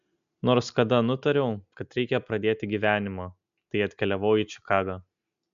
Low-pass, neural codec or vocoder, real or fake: 7.2 kHz; none; real